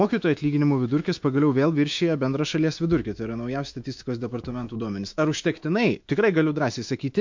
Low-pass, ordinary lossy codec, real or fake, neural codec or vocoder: 7.2 kHz; MP3, 48 kbps; fake; autoencoder, 48 kHz, 128 numbers a frame, DAC-VAE, trained on Japanese speech